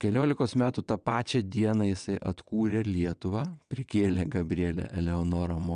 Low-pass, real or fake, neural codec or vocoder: 9.9 kHz; fake; vocoder, 22.05 kHz, 80 mel bands, WaveNeXt